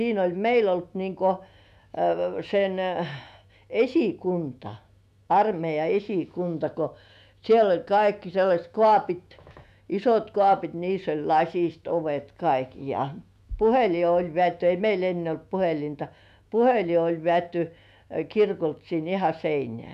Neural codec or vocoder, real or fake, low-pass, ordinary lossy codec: autoencoder, 48 kHz, 128 numbers a frame, DAC-VAE, trained on Japanese speech; fake; 14.4 kHz; none